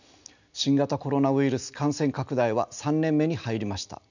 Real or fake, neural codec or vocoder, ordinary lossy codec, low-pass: real; none; none; 7.2 kHz